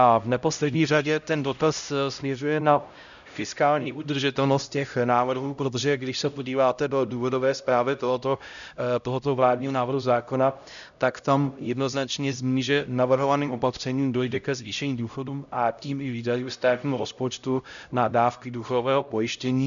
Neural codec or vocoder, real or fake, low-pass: codec, 16 kHz, 0.5 kbps, X-Codec, HuBERT features, trained on LibriSpeech; fake; 7.2 kHz